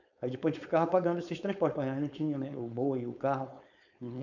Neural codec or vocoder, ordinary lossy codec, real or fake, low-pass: codec, 16 kHz, 4.8 kbps, FACodec; none; fake; 7.2 kHz